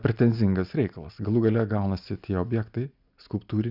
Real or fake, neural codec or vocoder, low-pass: real; none; 5.4 kHz